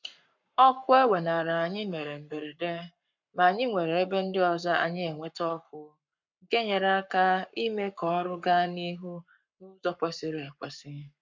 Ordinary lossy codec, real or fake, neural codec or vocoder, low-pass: MP3, 64 kbps; fake; codec, 44.1 kHz, 7.8 kbps, Pupu-Codec; 7.2 kHz